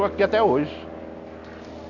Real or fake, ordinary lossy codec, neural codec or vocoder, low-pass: real; none; none; 7.2 kHz